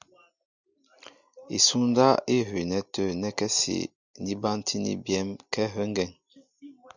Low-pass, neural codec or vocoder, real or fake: 7.2 kHz; none; real